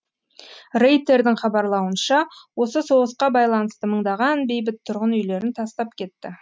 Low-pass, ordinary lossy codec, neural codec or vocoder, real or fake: none; none; none; real